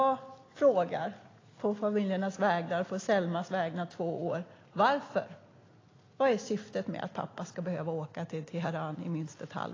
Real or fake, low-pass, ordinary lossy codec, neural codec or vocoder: real; 7.2 kHz; AAC, 32 kbps; none